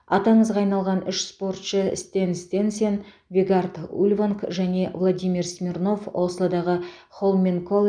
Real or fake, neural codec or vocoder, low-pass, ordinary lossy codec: real; none; 9.9 kHz; none